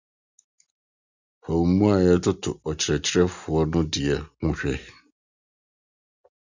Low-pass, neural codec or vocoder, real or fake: 7.2 kHz; none; real